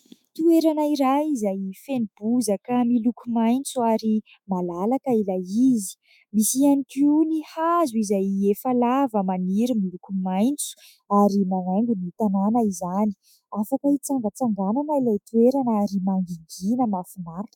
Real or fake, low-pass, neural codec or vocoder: fake; 19.8 kHz; autoencoder, 48 kHz, 128 numbers a frame, DAC-VAE, trained on Japanese speech